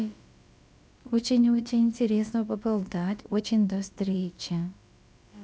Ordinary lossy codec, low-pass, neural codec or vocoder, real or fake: none; none; codec, 16 kHz, about 1 kbps, DyCAST, with the encoder's durations; fake